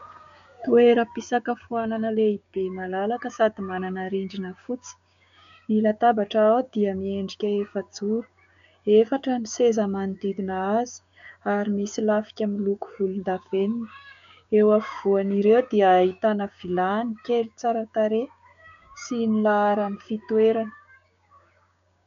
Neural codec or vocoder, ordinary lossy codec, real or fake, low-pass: codec, 16 kHz, 6 kbps, DAC; MP3, 64 kbps; fake; 7.2 kHz